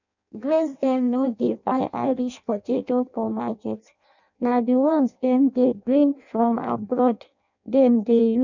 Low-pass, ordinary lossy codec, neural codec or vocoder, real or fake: 7.2 kHz; none; codec, 16 kHz in and 24 kHz out, 0.6 kbps, FireRedTTS-2 codec; fake